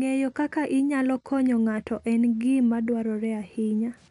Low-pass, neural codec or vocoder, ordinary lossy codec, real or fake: 10.8 kHz; none; MP3, 96 kbps; real